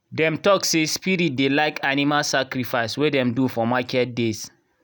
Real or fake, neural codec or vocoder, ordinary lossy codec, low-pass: real; none; none; none